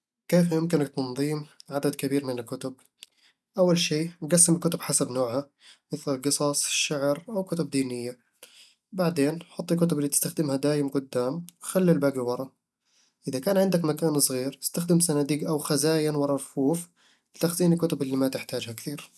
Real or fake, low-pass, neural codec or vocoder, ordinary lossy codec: real; none; none; none